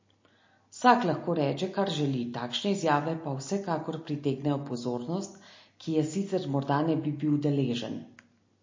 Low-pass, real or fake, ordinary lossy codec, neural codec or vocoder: 7.2 kHz; real; MP3, 32 kbps; none